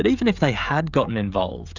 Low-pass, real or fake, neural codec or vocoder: 7.2 kHz; fake; codec, 16 kHz, 16 kbps, FreqCodec, smaller model